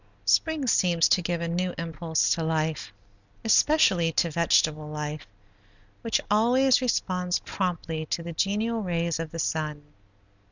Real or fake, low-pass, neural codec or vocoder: real; 7.2 kHz; none